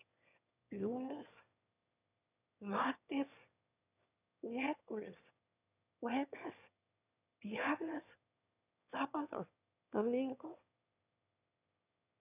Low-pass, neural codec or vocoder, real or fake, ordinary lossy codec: 3.6 kHz; autoencoder, 22.05 kHz, a latent of 192 numbers a frame, VITS, trained on one speaker; fake; none